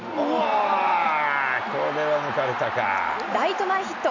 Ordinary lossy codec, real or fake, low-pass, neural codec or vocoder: none; real; 7.2 kHz; none